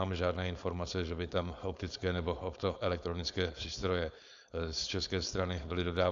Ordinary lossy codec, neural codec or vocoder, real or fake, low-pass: AAC, 64 kbps; codec, 16 kHz, 4.8 kbps, FACodec; fake; 7.2 kHz